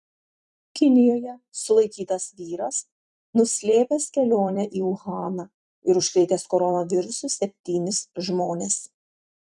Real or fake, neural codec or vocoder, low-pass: fake; vocoder, 44.1 kHz, 128 mel bands every 256 samples, BigVGAN v2; 10.8 kHz